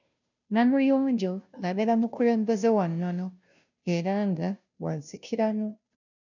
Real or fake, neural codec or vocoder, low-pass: fake; codec, 16 kHz, 0.5 kbps, FunCodec, trained on Chinese and English, 25 frames a second; 7.2 kHz